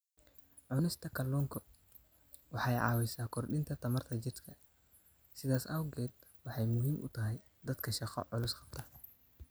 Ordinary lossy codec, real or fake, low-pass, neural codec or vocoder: none; real; none; none